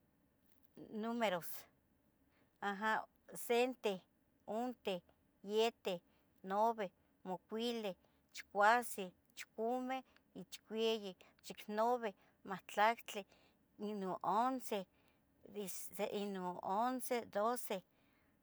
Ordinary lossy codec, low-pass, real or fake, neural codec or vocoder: none; none; real; none